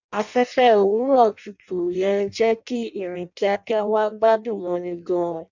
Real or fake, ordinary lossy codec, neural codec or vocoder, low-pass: fake; none; codec, 16 kHz in and 24 kHz out, 0.6 kbps, FireRedTTS-2 codec; 7.2 kHz